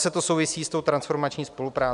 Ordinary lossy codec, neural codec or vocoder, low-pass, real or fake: MP3, 96 kbps; none; 10.8 kHz; real